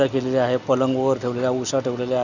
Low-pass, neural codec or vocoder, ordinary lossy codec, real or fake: 7.2 kHz; none; none; real